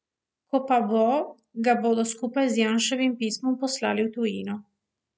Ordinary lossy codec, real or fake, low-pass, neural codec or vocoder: none; real; none; none